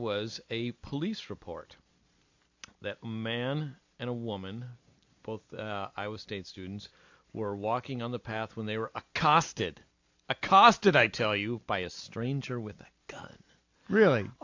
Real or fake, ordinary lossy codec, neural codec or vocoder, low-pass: real; AAC, 48 kbps; none; 7.2 kHz